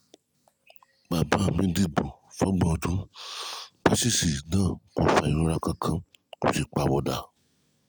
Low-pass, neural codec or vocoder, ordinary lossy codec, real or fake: none; none; none; real